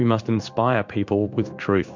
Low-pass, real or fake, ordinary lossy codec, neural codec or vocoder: 7.2 kHz; fake; MP3, 64 kbps; codec, 16 kHz in and 24 kHz out, 1 kbps, XY-Tokenizer